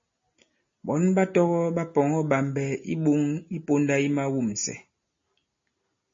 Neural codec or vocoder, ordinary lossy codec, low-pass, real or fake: none; MP3, 32 kbps; 7.2 kHz; real